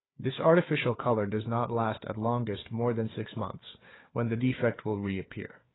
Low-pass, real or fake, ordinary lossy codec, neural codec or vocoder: 7.2 kHz; fake; AAC, 16 kbps; codec, 16 kHz, 4 kbps, FunCodec, trained on Chinese and English, 50 frames a second